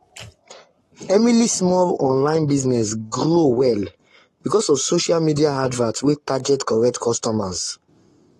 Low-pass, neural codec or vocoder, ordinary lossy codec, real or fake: 19.8 kHz; codec, 44.1 kHz, 7.8 kbps, Pupu-Codec; AAC, 32 kbps; fake